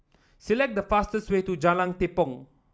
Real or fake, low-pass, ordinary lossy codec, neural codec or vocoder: real; none; none; none